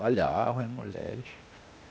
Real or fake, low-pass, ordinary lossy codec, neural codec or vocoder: fake; none; none; codec, 16 kHz, 0.8 kbps, ZipCodec